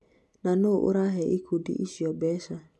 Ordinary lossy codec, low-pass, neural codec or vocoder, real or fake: none; none; none; real